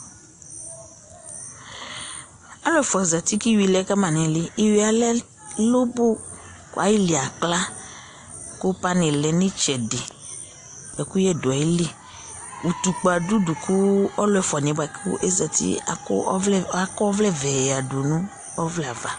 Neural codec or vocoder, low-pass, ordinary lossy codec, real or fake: none; 10.8 kHz; AAC, 48 kbps; real